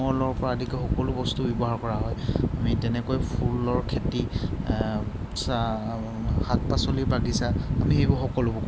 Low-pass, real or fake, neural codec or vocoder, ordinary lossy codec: none; real; none; none